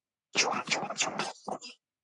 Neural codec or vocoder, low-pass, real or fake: codec, 44.1 kHz, 3.4 kbps, Pupu-Codec; 10.8 kHz; fake